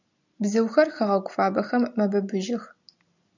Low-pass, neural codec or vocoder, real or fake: 7.2 kHz; none; real